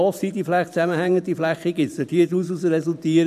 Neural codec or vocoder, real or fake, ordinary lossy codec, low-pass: vocoder, 44.1 kHz, 128 mel bands every 512 samples, BigVGAN v2; fake; none; 14.4 kHz